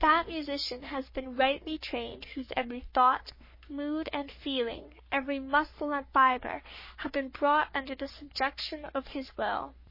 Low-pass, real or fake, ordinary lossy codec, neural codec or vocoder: 5.4 kHz; fake; MP3, 24 kbps; codec, 44.1 kHz, 3.4 kbps, Pupu-Codec